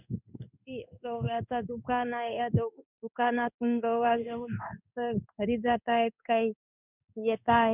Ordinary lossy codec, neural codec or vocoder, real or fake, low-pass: none; codec, 16 kHz in and 24 kHz out, 1 kbps, XY-Tokenizer; fake; 3.6 kHz